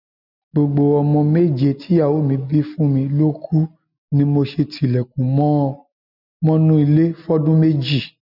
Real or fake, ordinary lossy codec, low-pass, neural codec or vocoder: real; none; 5.4 kHz; none